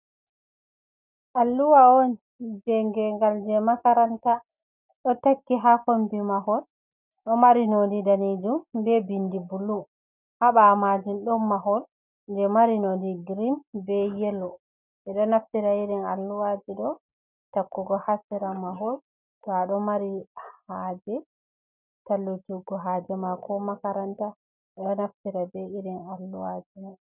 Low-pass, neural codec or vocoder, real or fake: 3.6 kHz; none; real